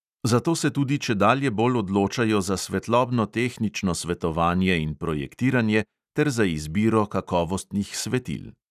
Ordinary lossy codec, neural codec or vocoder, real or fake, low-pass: none; none; real; 14.4 kHz